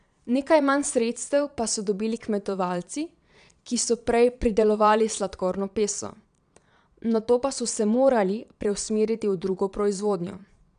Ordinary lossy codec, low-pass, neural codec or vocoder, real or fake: none; 9.9 kHz; vocoder, 22.05 kHz, 80 mel bands, Vocos; fake